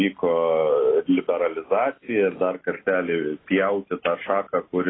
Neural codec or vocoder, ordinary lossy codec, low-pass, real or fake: none; AAC, 16 kbps; 7.2 kHz; real